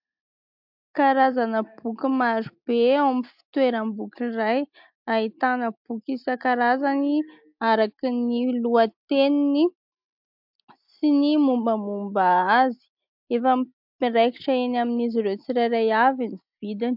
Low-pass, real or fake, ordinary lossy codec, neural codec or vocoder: 5.4 kHz; real; MP3, 48 kbps; none